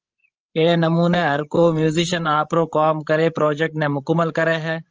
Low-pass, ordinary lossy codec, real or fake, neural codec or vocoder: 7.2 kHz; Opus, 24 kbps; fake; codec, 16 kHz, 16 kbps, FreqCodec, larger model